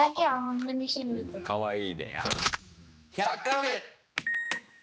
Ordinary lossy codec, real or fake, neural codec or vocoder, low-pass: none; fake; codec, 16 kHz, 2 kbps, X-Codec, HuBERT features, trained on general audio; none